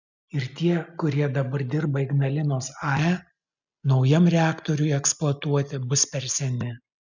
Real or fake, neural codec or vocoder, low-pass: real; none; 7.2 kHz